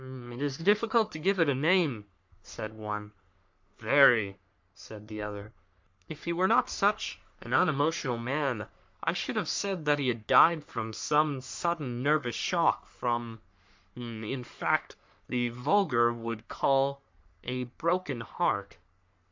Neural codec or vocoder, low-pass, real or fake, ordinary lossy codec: codec, 44.1 kHz, 3.4 kbps, Pupu-Codec; 7.2 kHz; fake; MP3, 64 kbps